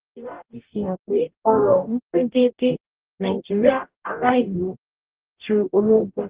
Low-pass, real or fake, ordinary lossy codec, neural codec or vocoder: 3.6 kHz; fake; Opus, 16 kbps; codec, 44.1 kHz, 0.9 kbps, DAC